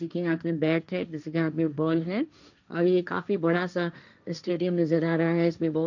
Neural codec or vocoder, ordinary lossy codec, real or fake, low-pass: codec, 16 kHz, 1.1 kbps, Voila-Tokenizer; none; fake; 7.2 kHz